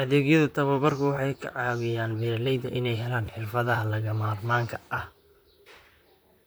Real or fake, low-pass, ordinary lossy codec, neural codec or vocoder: fake; none; none; vocoder, 44.1 kHz, 128 mel bands, Pupu-Vocoder